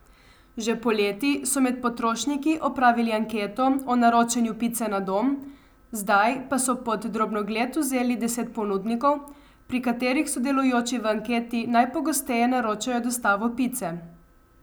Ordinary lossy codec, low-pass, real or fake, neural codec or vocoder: none; none; real; none